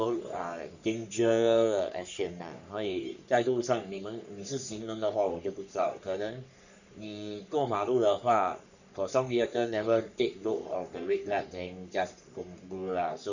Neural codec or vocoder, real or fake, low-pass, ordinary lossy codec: codec, 44.1 kHz, 3.4 kbps, Pupu-Codec; fake; 7.2 kHz; none